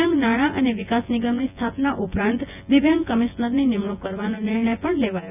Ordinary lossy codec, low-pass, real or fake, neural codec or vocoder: none; 3.6 kHz; fake; vocoder, 24 kHz, 100 mel bands, Vocos